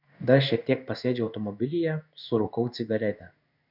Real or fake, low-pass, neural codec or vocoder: fake; 5.4 kHz; codec, 16 kHz in and 24 kHz out, 1 kbps, XY-Tokenizer